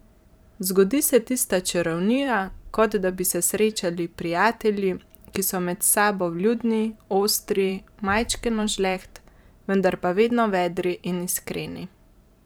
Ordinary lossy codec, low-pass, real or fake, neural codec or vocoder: none; none; fake; vocoder, 44.1 kHz, 128 mel bands every 512 samples, BigVGAN v2